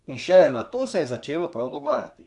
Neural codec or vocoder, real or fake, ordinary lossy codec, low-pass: codec, 24 kHz, 1 kbps, SNAC; fake; none; 10.8 kHz